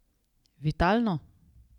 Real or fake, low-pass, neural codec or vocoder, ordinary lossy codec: fake; 19.8 kHz; vocoder, 44.1 kHz, 128 mel bands every 512 samples, BigVGAN v2; none